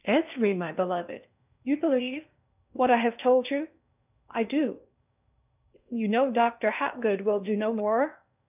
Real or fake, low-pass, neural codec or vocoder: fake; 3.6 kHz; codec, 16 kHz in and 24 kHz out, 0.6 kbps, FocalCodec, streaming, 4096 codes